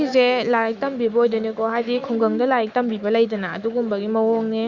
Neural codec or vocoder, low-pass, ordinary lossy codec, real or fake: autoencoder, 48 kHz, 128 numbers a frame, DAC-VAE, trained on Japanese speech; 7.2 kHz; Opus, 64 kbps; fake